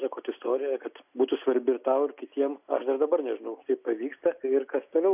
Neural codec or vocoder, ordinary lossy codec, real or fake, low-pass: none; AAC, 32 kbps; real; 3.6 kHz